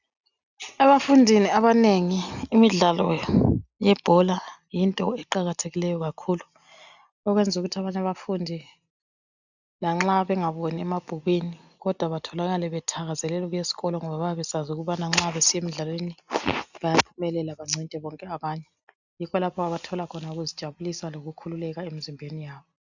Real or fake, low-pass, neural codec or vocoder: real; 7.2 kHz; none